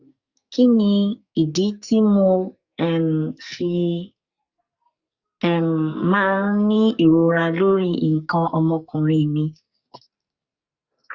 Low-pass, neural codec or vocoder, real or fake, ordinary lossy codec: 7.2 kHz; codec, 44.1 kHz, 2.6 kbps, SNAC; fake; Opus, 64 kbps